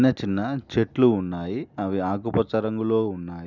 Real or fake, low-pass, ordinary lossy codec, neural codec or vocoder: real; 7.2 kHz; none; none